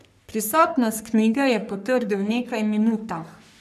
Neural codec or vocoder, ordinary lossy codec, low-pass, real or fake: codec, 44.1 kHz, 3.4 kbps, Pupu-Codec; none; 14.4 kHz; fake